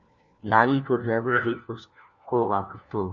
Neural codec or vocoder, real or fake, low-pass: codec, 16 kHz, 1 kbps, FunCodec, trained on LibriTTS, 50 frames a second; fake; 7.2 kHz